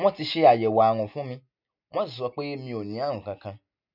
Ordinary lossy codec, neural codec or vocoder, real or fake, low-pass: none; none; real; 5.4 kHz